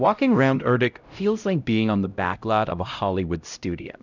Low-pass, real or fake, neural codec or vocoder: 7.2 kHz; fake; codec, 16 kHz, 0.5 kbps, X-Codec, HuBERT features, trained on LibriSpeech